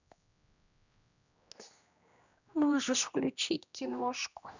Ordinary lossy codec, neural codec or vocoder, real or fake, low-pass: none; codec, 16 kHz, 1 kbps, X-Codec, HuBERT features, trained on general audio; fake; 7.2 kHz